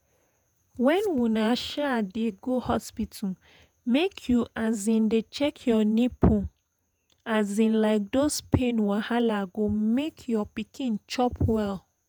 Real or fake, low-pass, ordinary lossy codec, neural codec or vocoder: fake; none; none; vocoder, 48 kHz, 128 mel bands, Vocos